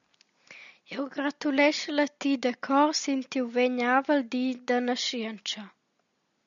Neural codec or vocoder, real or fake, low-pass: none; real; 7.2 kHz